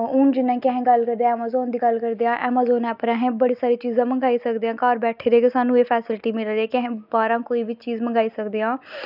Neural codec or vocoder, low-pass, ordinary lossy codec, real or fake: none; 5.4 kHz; none; real